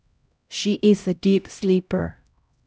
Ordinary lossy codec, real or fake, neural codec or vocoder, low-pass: none; fake; codec, 16 kHz, 0.5 kbps, X-Codec, HuBERT features, trained on LibriSpeech; none